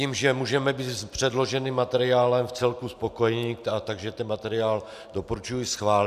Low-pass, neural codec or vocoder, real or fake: 14.4 kHz; none; real